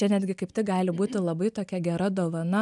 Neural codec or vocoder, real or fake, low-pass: none; real; 10.8 kHz